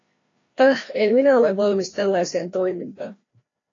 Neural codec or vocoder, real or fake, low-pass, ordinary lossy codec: codec, 16 kHz, 1 kbps, FreqCodec, larger model; fake; 7.2 kHz; AAC, 32 kbps